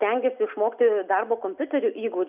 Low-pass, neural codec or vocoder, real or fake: 3.6 kHz; none; real